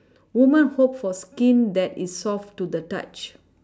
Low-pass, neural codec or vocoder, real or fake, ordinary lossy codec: none; none; real; none